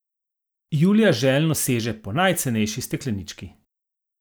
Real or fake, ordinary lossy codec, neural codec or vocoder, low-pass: real; none; none; none